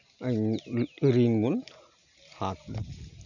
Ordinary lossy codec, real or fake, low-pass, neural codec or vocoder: none; real; 7.2 kHz; none